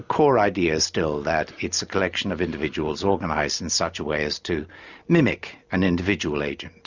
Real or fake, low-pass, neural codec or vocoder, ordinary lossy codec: real; 7.2 kHz; none; Opus, 64 kbps